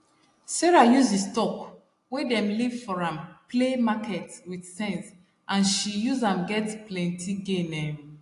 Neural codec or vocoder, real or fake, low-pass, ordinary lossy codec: none; real; 10.8 kHz; AAC, 48 kbps